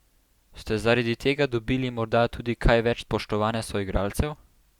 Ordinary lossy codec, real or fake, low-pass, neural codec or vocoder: Opus, 64 kbps; real; 19.8 kHz; none